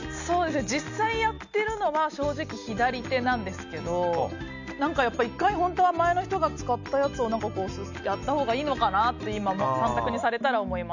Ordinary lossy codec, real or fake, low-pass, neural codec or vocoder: none; real; 7.2 kHz; none